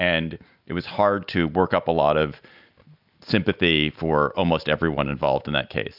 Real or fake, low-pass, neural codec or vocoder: real; 5.4 kHz; none